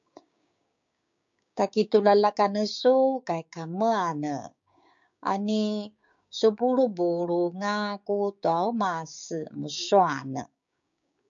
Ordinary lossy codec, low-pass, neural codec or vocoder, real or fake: MP3, 64 kbps; 7.2 kHz; codec, 16 kHz, 6 kbps, DAC; fake